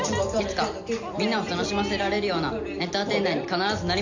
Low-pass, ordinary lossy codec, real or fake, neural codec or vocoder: 7.2 kHz; none; real; none